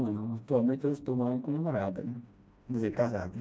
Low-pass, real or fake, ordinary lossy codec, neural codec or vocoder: none; fake; none; codec, 16 kHz, 1 kbps, FreqCodec, smaller model